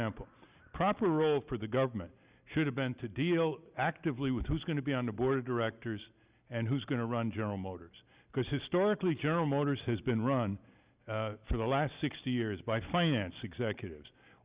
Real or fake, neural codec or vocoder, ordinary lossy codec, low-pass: real; none; Opus, 64 kbps; 3.6 kHz